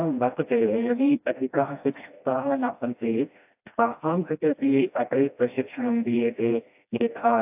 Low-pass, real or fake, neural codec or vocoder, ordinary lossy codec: 3.6 kHz; fake; codec, 16 kHz, 1 kbps, FreqCodec, smaller model; AAC, 32 kbps